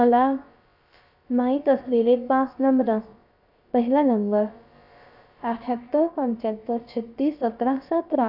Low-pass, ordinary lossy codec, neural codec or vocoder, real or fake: 5.4 kHz; none; codec, 16 kHz, about 1 kbps, DyCAST, with the encoder's durations; fake